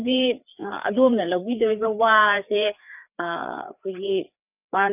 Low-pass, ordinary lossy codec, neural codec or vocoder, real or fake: 3.6 kHz; none; codec, 16 kHz, 4 kbps, FreqCodec, larger model; fake